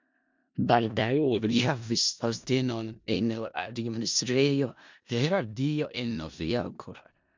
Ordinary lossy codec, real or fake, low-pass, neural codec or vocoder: MP3, 64 kbps; fake; 7.2 kHz; codec, 16 kHz in and 24 kHz out, 0.4 kbps, LongCat-Audio-Codec, four codebook decoder